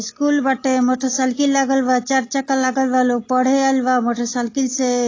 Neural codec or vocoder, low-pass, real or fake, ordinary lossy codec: none; 7.2 kHz; real; AAC, 32 kbps